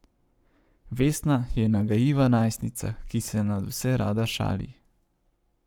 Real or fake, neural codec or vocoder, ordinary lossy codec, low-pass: fake; codec, 44.1 kHz, 7.8 kbps, Pupu-Codec; none; none